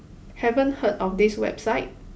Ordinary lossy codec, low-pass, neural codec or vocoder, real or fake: none; none; none; real